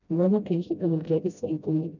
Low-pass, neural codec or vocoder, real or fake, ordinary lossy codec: 7.2 kHz; codec, 16 kHz, 0.5 kbps, FreqCodec, smaller model; fake; none